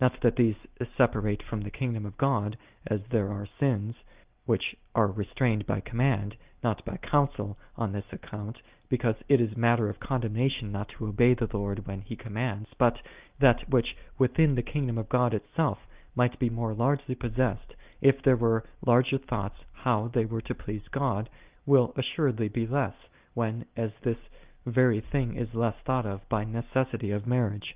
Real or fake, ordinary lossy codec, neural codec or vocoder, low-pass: real; Opus, 32 kbps; none; 3.6 kHz